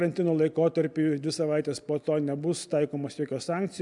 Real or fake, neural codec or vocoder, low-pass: real; none; 10.8 kHz